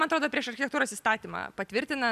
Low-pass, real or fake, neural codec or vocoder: 14.4 kHz; real; none